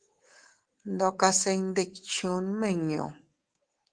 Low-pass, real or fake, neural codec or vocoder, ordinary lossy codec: 9.9 kHz; fake; codec, 24 kHz, 3.1 kbps, DualCodec; Opus, 16 kbps